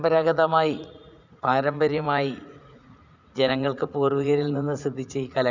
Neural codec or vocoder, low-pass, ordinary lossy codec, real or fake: vocoder, 44.1 kHz, 80 mel bands, Vocos; 7.2 kHz; none; fake